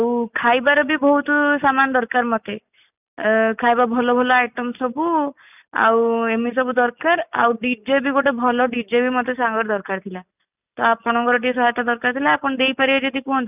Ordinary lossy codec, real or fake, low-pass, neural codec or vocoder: none; real; 3.6 kHz; none